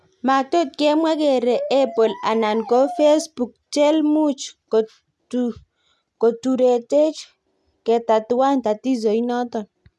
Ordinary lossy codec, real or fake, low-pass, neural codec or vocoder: none; real; none; none